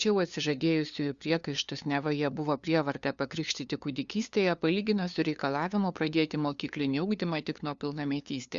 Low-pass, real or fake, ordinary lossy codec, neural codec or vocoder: 7.2 kHz; fake; Opus, 64 kbps; codec, 16 kHz, 2 kbps, FunCodec, trained on LibriTTS, 25 frames a second